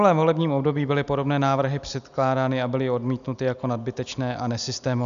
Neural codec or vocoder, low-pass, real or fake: none; 7.2 kHz; real